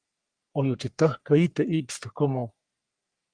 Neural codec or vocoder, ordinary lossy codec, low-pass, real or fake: codec, 44.1 kHz, 3.4 kbps, Pupu-Codec; Opus, 32 kbps; 9.9 kHz; fake